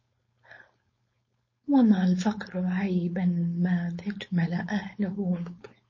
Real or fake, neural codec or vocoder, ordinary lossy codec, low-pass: fake; codec, 16 kHz, 4.8 kbps, FACodec; MP3, 32 kbps; 7.2 kHz